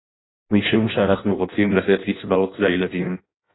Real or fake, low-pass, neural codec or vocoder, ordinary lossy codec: fake; 7.2 kHz; codec, 16 kHz in and 24 kHz out, 0.6 kbps, FireRedTTS-2 codec; AAC, 16 kbps